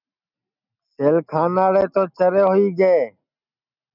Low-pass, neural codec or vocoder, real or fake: 5.4 kHz; none; real